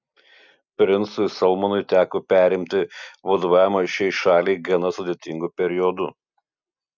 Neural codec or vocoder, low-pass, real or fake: none; 7.2 kHz; real